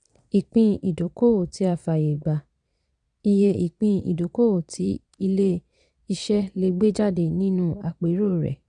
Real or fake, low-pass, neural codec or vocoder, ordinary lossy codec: fake; 9.9 kHz; vocoder, 22.05 kHz, 80 mel bands, Vocos; none